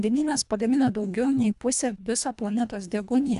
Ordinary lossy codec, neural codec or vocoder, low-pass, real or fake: AAC, 96 kbps; codec, 24 kHz, 1.5 kbps, HILCodec; 10.8 kHz; fake